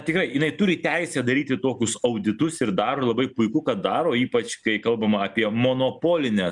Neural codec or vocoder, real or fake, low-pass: none; real; 10.8 kHz